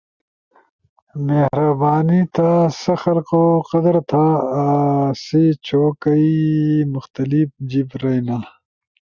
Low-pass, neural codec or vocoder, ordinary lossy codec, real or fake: 7.2 kHz; none; Opus, 64 kbps; real